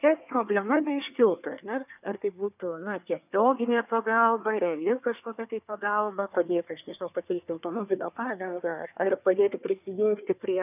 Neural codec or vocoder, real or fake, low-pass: codec, 24 kHz, 1 kbps, SNAC; fake; 3.6 kHz